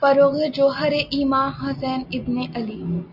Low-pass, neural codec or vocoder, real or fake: 5.4 kHz; none; real